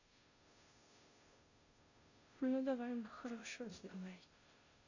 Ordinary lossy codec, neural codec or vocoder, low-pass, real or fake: AAC, 48 kbps; codec, 16 kHz, 0.5 kbps, FunCodec, trained on Chinese and English, 25 frames a second; 7.2 kHz; fake